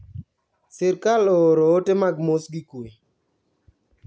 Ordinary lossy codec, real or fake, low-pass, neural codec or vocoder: none; real; none; none